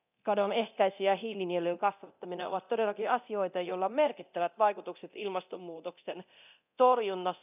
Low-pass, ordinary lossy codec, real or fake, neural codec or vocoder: 3.6 kHz; none; fake; codec, 24 kHz, 0.9 kbps, DualCodec